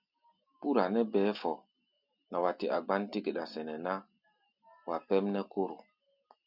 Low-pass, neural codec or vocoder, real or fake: 5.4 kHz; none; real